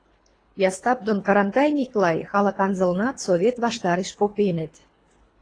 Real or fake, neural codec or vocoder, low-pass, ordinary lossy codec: fake; codec, 24 kHz, 3 kbps, HILCodec; 9.9 kHz; AAC, 32 kbps